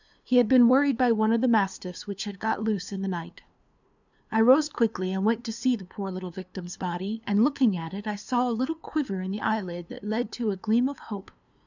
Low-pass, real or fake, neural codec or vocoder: 7.2 kHz; fake; codec, 16 kHz, 2 kbps, FunCodec, trained on LibriTTS, 25 frames a second